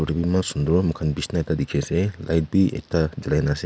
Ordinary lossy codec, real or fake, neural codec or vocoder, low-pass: none; real; none; none